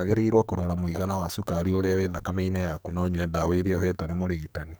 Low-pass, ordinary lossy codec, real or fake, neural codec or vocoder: none; none; fake; codec, 44.1 kHz, 2.6 kbps, SNAC